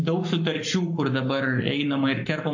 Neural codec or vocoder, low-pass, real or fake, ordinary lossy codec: codec, 44.1 kHz, 7.8 kbps, Pupu-Codec; 7.2 kHz; fake; MP3, 48 kbps